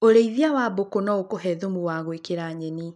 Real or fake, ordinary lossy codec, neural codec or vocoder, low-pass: real; none; none; 10.8 kHz